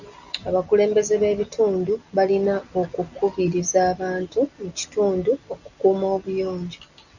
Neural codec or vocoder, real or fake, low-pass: none; real; 7.2 kHz